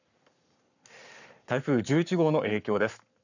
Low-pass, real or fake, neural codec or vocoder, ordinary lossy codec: 7.2 kHz; fake; codec, 44.1 kHz, 7.8 kbps, Pupu-Codec; none